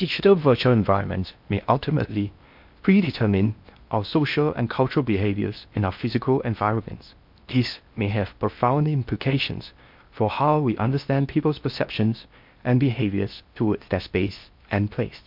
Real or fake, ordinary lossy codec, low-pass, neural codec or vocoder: fake; MP3, 48 kbps; 5.4 kHz; codec, 16 kHz in and 24 kHz out, 0.6 kbps, FocalCodec, streaming, 4096 codes